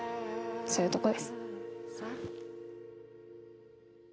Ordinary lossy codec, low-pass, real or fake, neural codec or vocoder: none; none; real; none